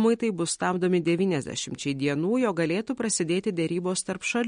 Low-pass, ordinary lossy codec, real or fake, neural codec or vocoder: 9.9 kHz; MP3, 48 kbps; real; none